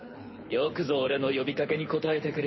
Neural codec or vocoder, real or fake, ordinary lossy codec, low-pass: codec, 24 kHz, 6 kbps, HILCodec; fake; MP3, 24 kbps; 7.2 kHz